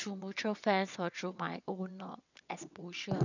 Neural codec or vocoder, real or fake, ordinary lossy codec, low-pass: vocoder, 22.05 kHz, 80 mel bands, WaveNeXt; fake; none; 7.2 kHz